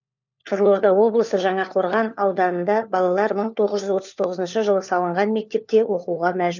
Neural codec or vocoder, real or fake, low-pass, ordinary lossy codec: codec, 16 kHz, 4 kbps, FunCodec, trained on LibriTTS, 50 frames a second; fake; 7.2 kHz; none